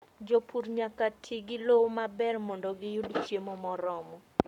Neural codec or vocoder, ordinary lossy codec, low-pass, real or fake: codec, 44.1 kHz, 7.8 kbps, Pupu-Codec; none; 19.8 kHz; fake